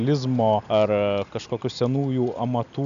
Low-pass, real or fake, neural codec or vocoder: 7.2 kHz; real; none